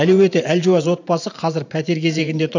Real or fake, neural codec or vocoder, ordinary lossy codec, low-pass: fake; vocoder, 44.1 kHz, 128 mel bands every 512 samples, BigVGAN v2; none; 7.2 kHz